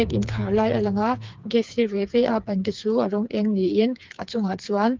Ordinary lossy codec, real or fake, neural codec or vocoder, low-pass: Opus, 32 kbps; fake; codec, 16 kHz, 4 kbps, FreqCodec, smaller model; 7.2 kHz